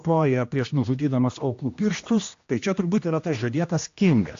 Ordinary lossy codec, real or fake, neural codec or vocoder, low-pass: AAC, 48 kbps; fake; codec, 16 kHz, 1 kbps, X-Codec, HuBERT features, trained on general audio; 7.2 kHz